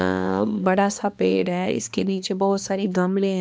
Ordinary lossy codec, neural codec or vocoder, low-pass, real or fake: none; codec, 16 kHz, 2 kbps, X-Codec, HuBERT features, trained on balanced general audio; none; fake